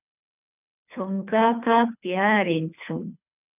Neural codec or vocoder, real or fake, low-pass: codec, 24 kHz, 3 kbps, HILCodec; fake; 3.6 kHz